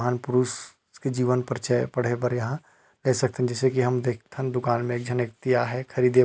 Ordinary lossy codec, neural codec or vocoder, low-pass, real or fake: none; none; none; real